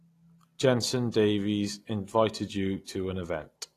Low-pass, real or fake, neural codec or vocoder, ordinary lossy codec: 14.4 kHz; real; none; AAC, 48 kbps